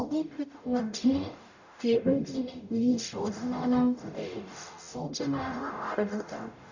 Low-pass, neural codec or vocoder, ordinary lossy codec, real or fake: 7.2 kHz; codec, 44.1 kHz, 0.9 kbps, DAC; none; fake